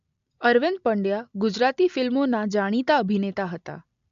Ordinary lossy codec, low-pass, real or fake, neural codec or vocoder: AAC, 64 kbps; 7.2 kHz; real; none